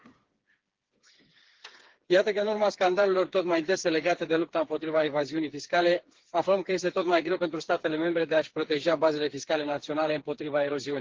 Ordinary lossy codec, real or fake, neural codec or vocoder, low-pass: Opus, 16 kbps; fake; codec, 16 kHz, 4 kbps, FreqCodec, smaller model; 7.2 kHz